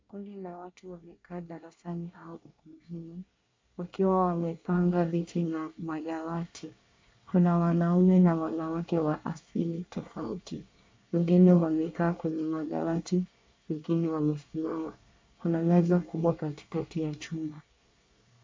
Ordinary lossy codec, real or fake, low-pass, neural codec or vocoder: MP3, 64 kbps; fake; 7.2 kHz; codec, 24 kHz, 1 kbps, SNAC